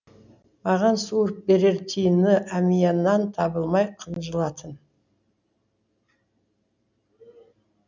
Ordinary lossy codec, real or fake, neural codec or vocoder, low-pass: none; real; none; 7.2 kHz